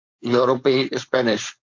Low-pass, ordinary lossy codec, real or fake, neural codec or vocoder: 7.2 kHz; MP3, 48 kbps; fake; codec, 16 kHz, 4.8 kbps, FACodec